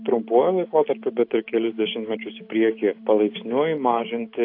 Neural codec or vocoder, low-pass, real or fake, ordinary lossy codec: none; 5.4 kHz; real; AAC, 32 kbps